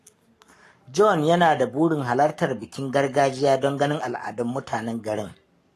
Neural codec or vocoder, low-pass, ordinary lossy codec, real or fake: codec, 44.1 kHz, 7.8 kbps, Pupu-Codec; 14.4 kHz; AAC, 48 kbps; fake